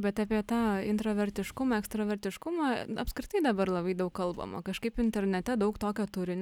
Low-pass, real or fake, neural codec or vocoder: 19.8 kHz; real; none